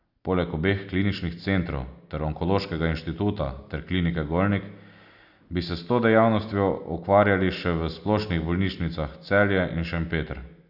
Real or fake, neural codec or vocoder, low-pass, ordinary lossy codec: real; none; 5.4 kHz; none